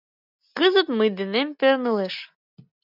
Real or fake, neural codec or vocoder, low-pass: real; none; 5.4 kHz